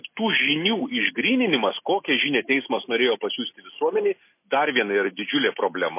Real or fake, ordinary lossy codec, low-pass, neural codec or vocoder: real; MP3, 24 kbps; 3.6 kHz; none